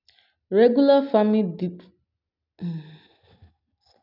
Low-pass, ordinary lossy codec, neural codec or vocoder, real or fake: 5.4 kHz; none; none; real